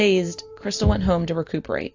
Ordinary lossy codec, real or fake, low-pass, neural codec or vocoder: AAC, 48 kbps; real; 7.2 kHz; none